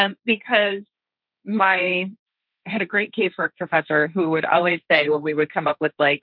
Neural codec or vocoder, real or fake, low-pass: codec, 16 kHz, 1.1 kbps, Voila-Tokenizer; fake; 5.4 kHz